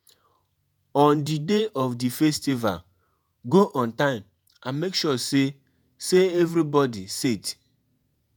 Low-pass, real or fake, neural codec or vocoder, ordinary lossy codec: none; fake; vocoder, 48 kHz, 128 mel bands, Vocos; none